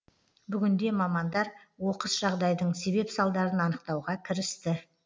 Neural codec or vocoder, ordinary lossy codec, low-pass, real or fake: none; none; none; real